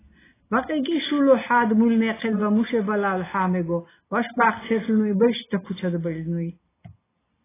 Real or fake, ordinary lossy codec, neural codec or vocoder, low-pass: real; AAC, 16 kbps; none; 3.6 kHz